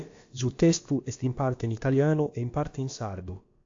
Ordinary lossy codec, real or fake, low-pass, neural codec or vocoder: AAC, 48 kbps; fake; 7.2 kHz; codec, 16 kHz, about 1 kbps, DyCAST, with the encoder's durations